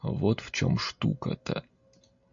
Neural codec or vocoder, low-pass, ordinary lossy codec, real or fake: none; 7.2 kHz; MP3, 48 kbps; real